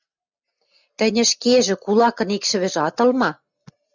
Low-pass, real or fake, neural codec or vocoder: 7.2 kHz; real; none